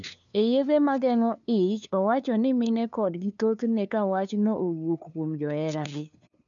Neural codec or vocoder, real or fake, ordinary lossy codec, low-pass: codec, 16 kHz, 2 kbps, FunCodec, trained on LibriTTS, 25 frames a second; fake; none; 7.2 kHz